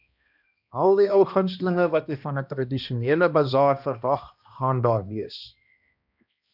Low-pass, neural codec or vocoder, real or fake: 5.4 kHz; codec, 16 kHz, 1 kbps, X-Codec, HuBERT features, trained on balanced general audio; fake